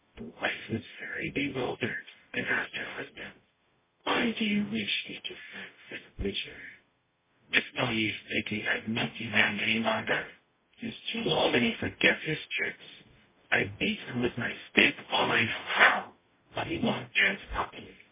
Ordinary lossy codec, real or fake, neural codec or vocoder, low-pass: MP3, 16 kbps; fake; codec, 44.1 kHz, 0.9 kbps, DAC; 3.6 kHz